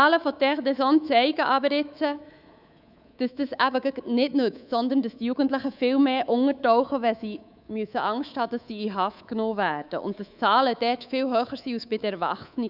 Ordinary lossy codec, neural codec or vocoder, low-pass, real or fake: none; codec, 24 kHz, 3.1 kbps, DualCodec; 5.4 kHz; fake